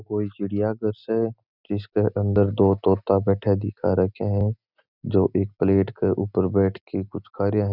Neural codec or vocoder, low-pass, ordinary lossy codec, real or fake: none; 5.4 kHz; none; real